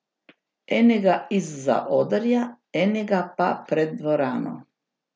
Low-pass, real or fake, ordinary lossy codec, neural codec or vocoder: none; real; none; none